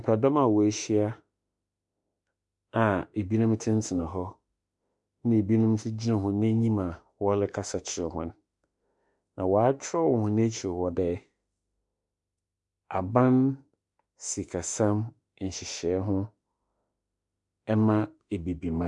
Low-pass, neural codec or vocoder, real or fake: 10.8 kHz; autoencoder, 48 kHz, 32 numbers a frame, DAC-VAE, trained on Japanese speech; fake